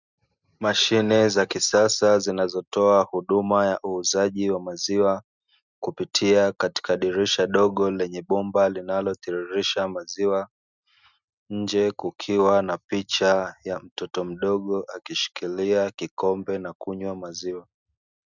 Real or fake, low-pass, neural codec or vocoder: real; 7.2 kHz; none